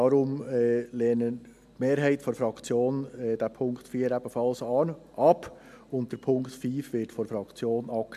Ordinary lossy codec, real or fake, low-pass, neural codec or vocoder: MP3, 96 kbps; real; 14.4 kHz; none